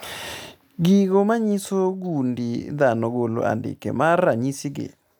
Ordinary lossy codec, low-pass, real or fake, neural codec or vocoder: none; none; real; none